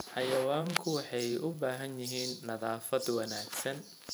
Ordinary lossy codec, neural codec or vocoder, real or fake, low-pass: none; vocoder, 44.1 kHz, 128 mel bands every 512 samples, BigVGAN v2; fake; none